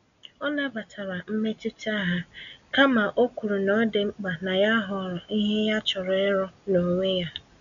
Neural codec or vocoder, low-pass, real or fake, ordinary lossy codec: none; 7.2 kHz; real; none